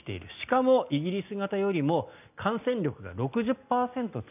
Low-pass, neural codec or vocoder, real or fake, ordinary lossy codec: 3.6 kHz; none; real; none